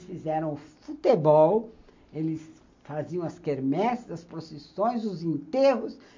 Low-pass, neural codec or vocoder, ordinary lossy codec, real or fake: 7.2 kHz; none; MP3, 48 kbps; real